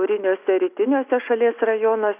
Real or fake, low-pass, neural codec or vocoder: real; 3.6 kHz; none